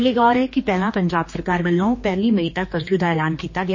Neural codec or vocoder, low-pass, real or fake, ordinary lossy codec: codec, 16 kHz, 2 kbps, X-Codec, HuBERT features, trained on general audio; 7.2 kHz; fake; MP3, 32 kbps